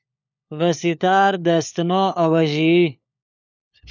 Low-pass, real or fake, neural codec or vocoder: 7.2 kHz; fake; codec, 16 kHz, 4 kbps, FunCodec, trained on LibriTTS, 50 frames a second